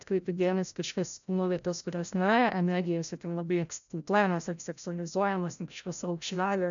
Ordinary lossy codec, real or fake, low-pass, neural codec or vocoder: MP3, 96 kbps; fake; 7.2 kHz; codec, 16 kHz, 0.5 kbps, FreqCodec, larger model